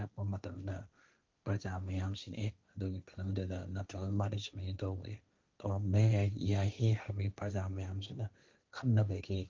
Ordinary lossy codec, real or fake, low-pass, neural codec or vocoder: Opus, 32 kbps; fake; 7.2 kHz; codec, 16 kHz, 1.1 kbps, Voila-Tokenizer